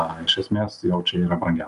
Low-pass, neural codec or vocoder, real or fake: 10.8 kHz; none; real